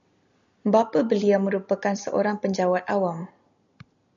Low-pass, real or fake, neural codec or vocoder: 7.2 kHz; real; none